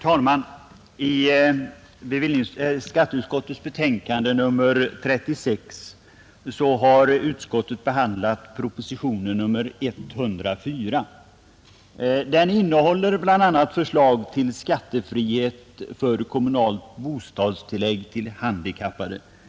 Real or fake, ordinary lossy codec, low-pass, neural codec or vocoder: real; none; none; none